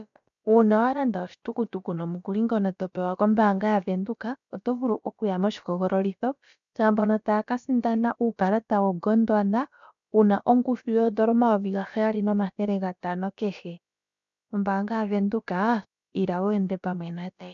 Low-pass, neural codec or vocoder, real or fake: 7.2 kHz; codec, 16 kHz, about 1 kbps, DyCAST, with the encoder's durations; fake